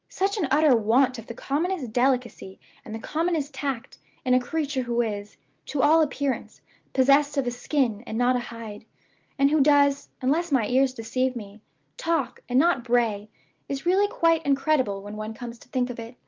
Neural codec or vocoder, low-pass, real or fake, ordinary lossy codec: none; 7.2 kHz; real; Opus, 32 kbps